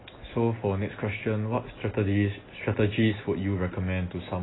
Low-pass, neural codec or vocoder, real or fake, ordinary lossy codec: 7.2 kHz; none; real; AAC, 16 kbps